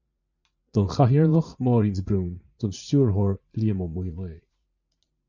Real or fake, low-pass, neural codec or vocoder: fake; 7.2 kHz; vocoder, 44.1 kHz, 80 mel bands, Vocos